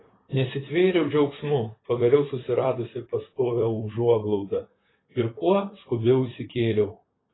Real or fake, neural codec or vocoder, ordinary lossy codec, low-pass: fake; vocoder, 44.1 kHz, 128 mel bands, Pupu-Vocoder; AAC, 16 kbps; 7.2 kHz